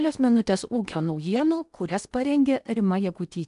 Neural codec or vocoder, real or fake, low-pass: codec, 16 kHz in and 24 kHz out, 0.8 kbps, FocalCodec, streaming, 65536 codes; fake; 10.8 kHz